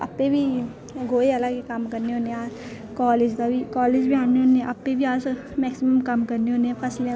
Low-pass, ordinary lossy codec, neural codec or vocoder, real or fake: none; none; none; real